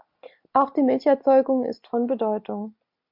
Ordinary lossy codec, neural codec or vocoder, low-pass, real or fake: MP3, 48 kbps; none; 5.4 kHz; real